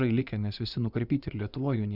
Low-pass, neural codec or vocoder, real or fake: 5.4 kHz; vocoder, 24 kHz, 100 mel bands, Vocos; fake